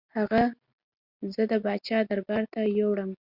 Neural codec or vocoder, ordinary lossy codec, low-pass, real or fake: none; Opus, 64 kbps; 5.4 kHz; real